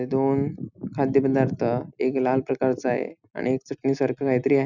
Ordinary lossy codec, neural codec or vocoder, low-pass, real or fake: none; none; 7.2 kHz; real